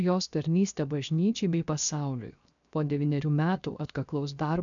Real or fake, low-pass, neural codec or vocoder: fake; 7.2 kHz; codec, 16 kHz, 0.7 kbps, FocalCodec